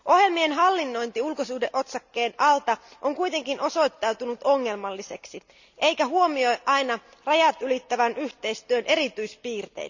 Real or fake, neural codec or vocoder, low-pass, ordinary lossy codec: real; none; 7.2 kHz; none